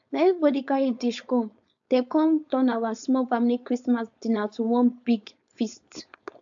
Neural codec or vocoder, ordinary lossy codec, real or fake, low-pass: codec, 16 kHz, 4.8 kbps, FACodec; AAC, 64 kbps; fake; 7.2 kHz